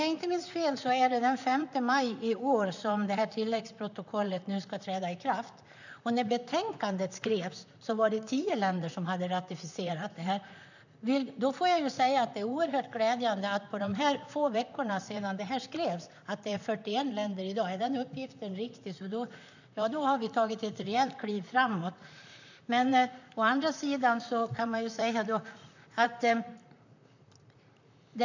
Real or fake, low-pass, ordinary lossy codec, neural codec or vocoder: fake; 7.2 kHz; none; vocoder, 44.1 kHz, 128 mel bands, Pupu-Vocoder